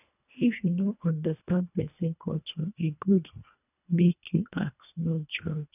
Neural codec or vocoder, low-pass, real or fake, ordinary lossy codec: codec, 24 kHz, 1.5 kbps, HILCodec; 3.6 kHz; fake; none